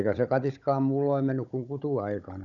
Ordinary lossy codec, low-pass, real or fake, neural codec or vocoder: none; 7.2 kHz; fake; codec, 16 kHz, 16 kbps, FunCodec, trained on Chinese and English, 50 frames a second